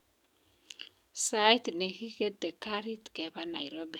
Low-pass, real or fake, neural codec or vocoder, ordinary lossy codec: 19.8 kHz; fake; codec, 44.1 kHz, 7.8 kbps, DAC; none